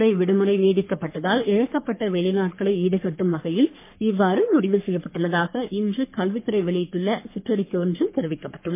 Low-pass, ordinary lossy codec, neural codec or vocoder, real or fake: 3.6 kHz; MP3, 24 kbps; codec, 44.1 kHz, 3.4 kbps, Pupu-Codec; fake